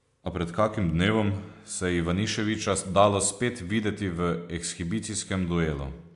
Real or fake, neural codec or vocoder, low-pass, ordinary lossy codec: real; none; 10.8 kHz; AAC, 64 kbps